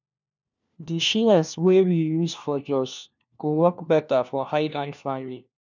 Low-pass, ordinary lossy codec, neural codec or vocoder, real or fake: 7.2 kHz; none; codec, 16 kHz, 1 kbps, FunCodec, trained on LibriTTS, 50 frames a second; fake